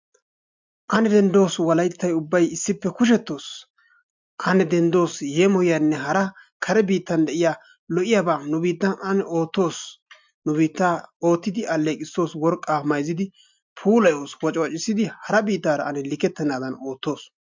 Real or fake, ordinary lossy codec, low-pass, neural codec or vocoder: real; MP3, 64 kbps; 7.2 kHz; none